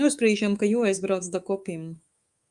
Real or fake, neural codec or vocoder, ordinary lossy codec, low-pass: fake; codec, 24 kHz, 3.1 kbps, DualCodec; Opus, 32 kbps; 10.8 kHz